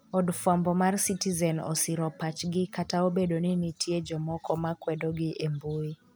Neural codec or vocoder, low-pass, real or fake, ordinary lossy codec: none; none; real; none